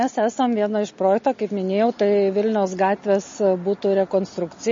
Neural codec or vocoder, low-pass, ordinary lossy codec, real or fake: none; 7.2 kHz; MP3, 32 kbps; real